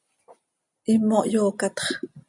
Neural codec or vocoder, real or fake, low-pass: none; real; 10.8 kHz